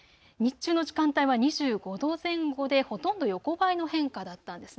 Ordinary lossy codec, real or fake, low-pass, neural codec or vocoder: none; real; none; none